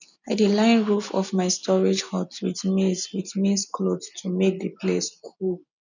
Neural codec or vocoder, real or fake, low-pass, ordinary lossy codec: none; real; 7.2 kHz; none